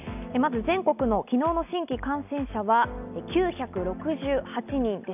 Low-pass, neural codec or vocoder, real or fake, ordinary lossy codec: 3.6 kHz; none; real; none